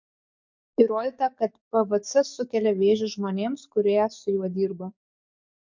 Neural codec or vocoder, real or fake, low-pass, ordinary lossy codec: codec, 44.1 kHz, 7.8 kbps, DAC; fake; 7.2 kHz; MP3, 48 kbps